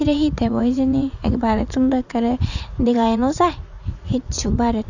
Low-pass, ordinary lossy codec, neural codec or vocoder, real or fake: 7.2 kHz; none; vocoder, 44.1 kHz, 80 mel bands, Vocos; fake